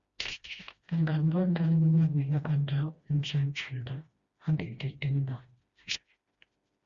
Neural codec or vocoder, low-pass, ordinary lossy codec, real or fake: codec, 16 kHz, 1 kbps, FreqCodec, smaller model; 7.2 kHz; Opus, 64 kbps; fake